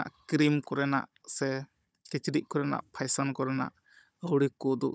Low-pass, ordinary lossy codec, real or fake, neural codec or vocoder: none; none; fake; codec, 16 kHz, 16 kbps, FunCodec, trained on Chinese and English, 50 frames a second